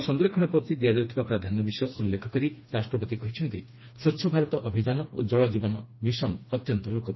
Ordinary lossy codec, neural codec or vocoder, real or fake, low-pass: MP3, 24 kbps; codec, 16 kHz, 2 kbps, FreqCodec, smaller model; fake; 7.2 kHz